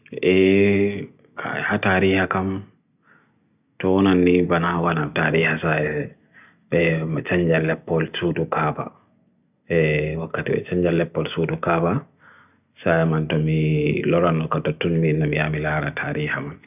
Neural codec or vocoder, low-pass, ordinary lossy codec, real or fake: none; 3.6 kHz; none; real